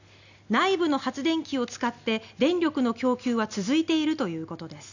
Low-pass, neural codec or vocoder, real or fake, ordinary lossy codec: 7.2 kHz; none; real; none